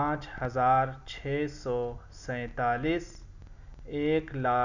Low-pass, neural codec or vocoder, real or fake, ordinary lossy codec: 7.2 kHz; none; real; none